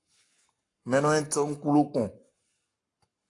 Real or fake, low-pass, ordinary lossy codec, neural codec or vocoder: fake; 10.8 kHz; AAC, 48 kbps; codec, 44.1 kHz, 7.8 kbps, Pupu-Codec